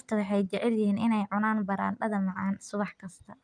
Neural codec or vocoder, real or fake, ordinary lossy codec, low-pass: none; real; Opus, 32 kbps; 9.9 kHz